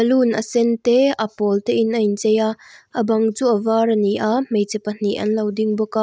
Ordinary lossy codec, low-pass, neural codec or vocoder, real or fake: none; none; none; real